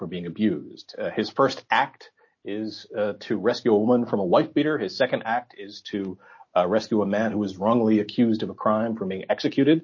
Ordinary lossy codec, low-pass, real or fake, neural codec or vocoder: MP3, 32 kbps; 7.2 kHz; real; none